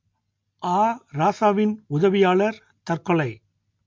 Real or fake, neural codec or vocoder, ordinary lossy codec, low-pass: real; none; MP3, 48 kbps; 7.2 kHz